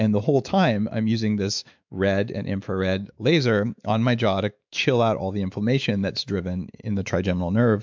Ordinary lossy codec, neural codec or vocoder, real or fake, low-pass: MP3, 64 kbps; autoencoder, 48 kHz, 128 numbers a frame, DAC-VAE, trained on Japanese speech; fake; 7.2 kHz